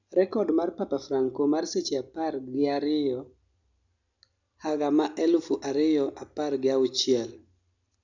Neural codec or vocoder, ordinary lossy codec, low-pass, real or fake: none; none; 7.2 kHz; real